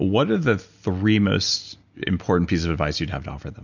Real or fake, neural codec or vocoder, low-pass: real; none; 7.2 kHz